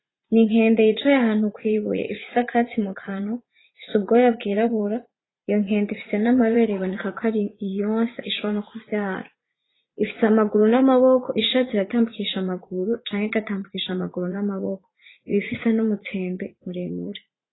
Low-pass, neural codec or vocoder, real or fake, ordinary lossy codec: 7.2 kHz; vocoder, 44.1 kHz, 80 mel bands, Vocos; fake; AAC, 16 kbps